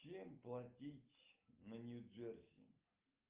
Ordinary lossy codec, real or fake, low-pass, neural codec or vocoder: Opus, 24 kbps; real; 3.6 kHz; none